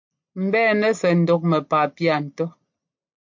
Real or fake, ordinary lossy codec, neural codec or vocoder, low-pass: real; MP3, 48 kbps; none; 7.2 kHz